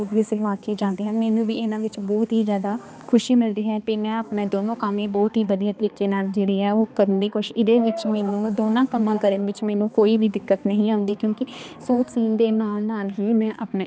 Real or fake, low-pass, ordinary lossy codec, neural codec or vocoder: fake; none; none; codec, 16 kHz, 2 kbps, X-Codec, HuBERT features, trained on balanced general audio